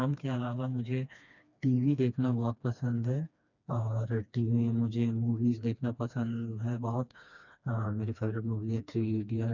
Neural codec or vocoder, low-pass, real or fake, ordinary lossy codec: codec, 16 kHz, 2 kbps, FreqCodec, smaller model; 7.2 kHz; fake; none